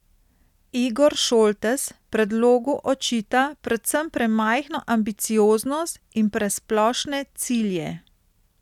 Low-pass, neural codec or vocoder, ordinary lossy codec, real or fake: 19.8 kHz; none; none; real